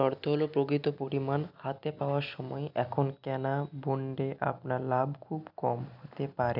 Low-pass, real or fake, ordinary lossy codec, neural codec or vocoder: 5.4 kHz; real; none; none